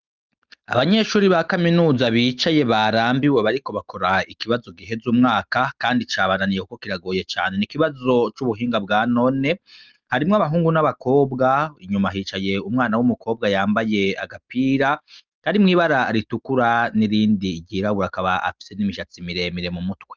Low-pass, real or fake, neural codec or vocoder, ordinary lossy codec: 7.2 kHz; real; none; Opus, 24 kbps